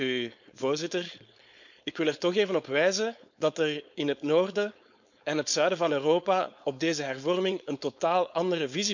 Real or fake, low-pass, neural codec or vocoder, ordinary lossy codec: fake; 7.2 kHz; codec, 16 kHz, 4.8 kbps, FACodec; none